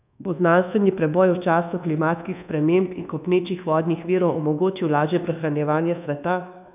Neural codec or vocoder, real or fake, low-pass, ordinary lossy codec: codec, 24 kHz, 1.2 kbps, DualCodec; fake; 3.6 kHz; none